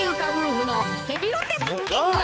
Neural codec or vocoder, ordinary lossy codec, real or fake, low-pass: codec, 16 kHz, 4 kbps, X-Codec, HuBERT features, trained on balanced general audio; none; fake; none